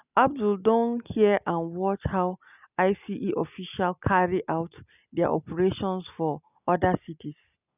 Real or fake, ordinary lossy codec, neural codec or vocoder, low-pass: real; none; none; 3.6 kHz